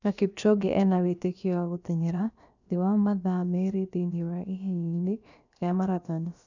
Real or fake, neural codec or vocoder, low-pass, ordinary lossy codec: fake; codec, 16 kHz, about 1 kbps, DyCAST, with the encoder's durations; 7.2 kHz; none